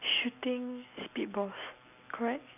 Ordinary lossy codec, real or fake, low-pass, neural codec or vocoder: none; real; 3.6 kHz; none